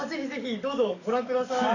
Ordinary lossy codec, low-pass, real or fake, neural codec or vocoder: none; 7.2 kHz; fake; codec, 44.1 kHz, 7.8 kbps, DAC